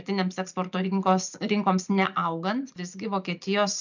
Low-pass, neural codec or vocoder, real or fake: 7.2 kHz; none; real